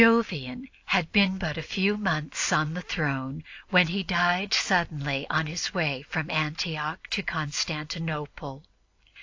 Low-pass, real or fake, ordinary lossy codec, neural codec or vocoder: 7.2 kHz; real; AAC, 48 kbps; none